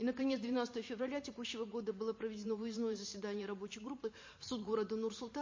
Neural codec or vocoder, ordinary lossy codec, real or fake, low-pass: vocoder, 44.1 kHz, 128 mel bands every 512 samples, BigVGAN v2; MP3, 32 kbps; fake; 7.2 kHz